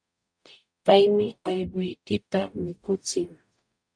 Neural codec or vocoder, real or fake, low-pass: codec, 44.1 kHz, 0.9 kbps, DAC; fake; 9.9 kHz